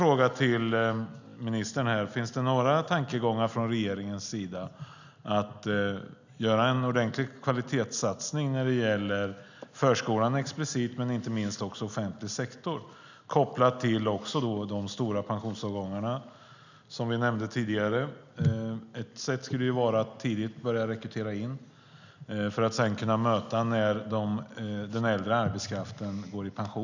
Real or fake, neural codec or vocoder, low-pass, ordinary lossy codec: real; none; 7.2 kHz; none